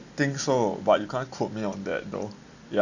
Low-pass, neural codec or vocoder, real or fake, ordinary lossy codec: 7.2 kHz; none; real; none